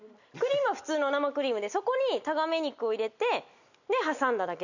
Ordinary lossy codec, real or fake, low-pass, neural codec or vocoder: none; real; 7.2 kHz; none